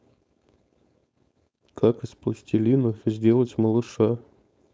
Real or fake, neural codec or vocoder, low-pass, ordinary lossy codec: fake; codec, 16 kHz, 4.8 kbps, FACodec; none; none